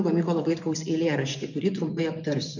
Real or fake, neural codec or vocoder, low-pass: fake; vocoder, 24 kHz, 100 mel bands, Vocos; 7.2 kHz